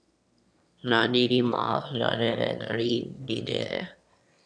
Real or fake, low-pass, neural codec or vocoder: fake; 9.9 kHz; autoencoder, 22.05 kHz, a latent of 192 numbers a frame, VITS, trained on one speaker